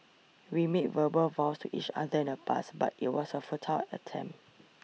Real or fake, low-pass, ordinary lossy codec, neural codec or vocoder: real; none; none; none